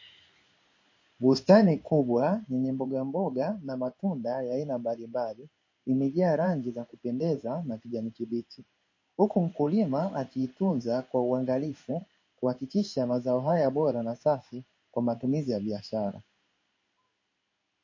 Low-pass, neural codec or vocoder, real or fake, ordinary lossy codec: 7.2 kHz; codec, 16 kHz in and 24 kHz out, 1 kbps, XY-Tokenizer; fake; MP3, 32 kbps